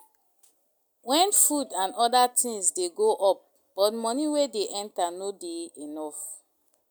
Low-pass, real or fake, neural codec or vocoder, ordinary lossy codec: none; real; none; none